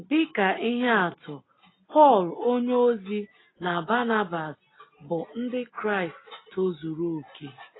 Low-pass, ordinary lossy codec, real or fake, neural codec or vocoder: 7.2 kHz; AAC, 16 kbps; real; none